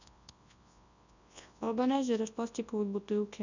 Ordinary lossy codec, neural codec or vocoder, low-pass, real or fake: none; codec, 24 kHz, 0.9 kbps, WavTokenizer, large speech release; 7.2 kHz; fake